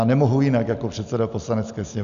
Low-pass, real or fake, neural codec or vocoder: 7.2 kHz; real; none